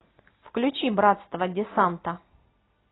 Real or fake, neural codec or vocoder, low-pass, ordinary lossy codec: real; none; 7.2 kHz; AAC, 16 kbps